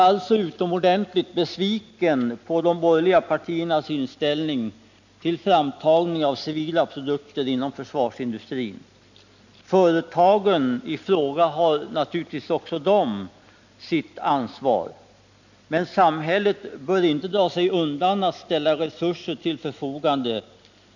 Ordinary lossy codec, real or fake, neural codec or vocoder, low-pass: none; real; none; 7.2 kHz